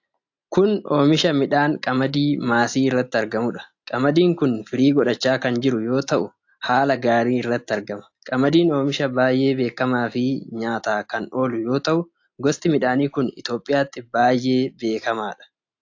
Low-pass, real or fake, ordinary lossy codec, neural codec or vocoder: 7.2 kHz; real; AAC, 48 kbps; none